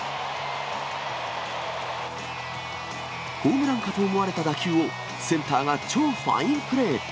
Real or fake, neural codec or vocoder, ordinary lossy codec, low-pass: real; none; none; none